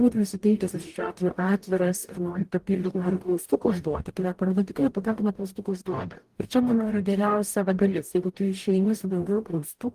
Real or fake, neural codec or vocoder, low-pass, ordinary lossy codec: fake; codec, 44.1 kHz, 0.9 kbps, DAC; 14.4 kHz; Opus, 24 kbps